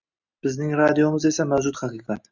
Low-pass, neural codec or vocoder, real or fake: 7.2 kHz; none; real